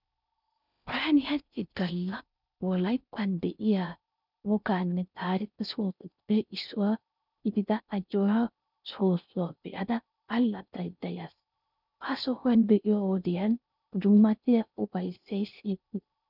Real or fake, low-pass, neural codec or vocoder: fake; 5.4 kHz; codec, 16 kHz in and 24 kHz out, 0.6 kbps, FocalCodec, streaming, 4096 codes